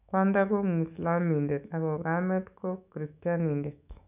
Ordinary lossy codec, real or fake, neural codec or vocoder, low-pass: none; fake; autoencoder, 48 kHz, 128 numbers a frame, DAC-VAE, trained on Japanese speech; 3.6 kHz